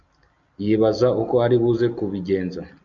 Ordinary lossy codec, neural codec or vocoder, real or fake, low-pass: MP3, 64 kbps; none; real; 7.2 kHz